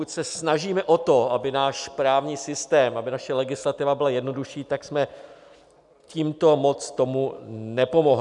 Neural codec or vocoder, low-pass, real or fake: none; 10.8 kHz; real